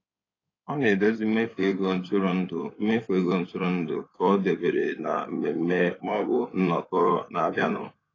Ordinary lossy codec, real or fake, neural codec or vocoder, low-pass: AAC, 32 kbps; fake; codec, 16 kHz in and 24 kHz out, 2.2 kbps, FireRedTTS-2 codec; 7.2 kHz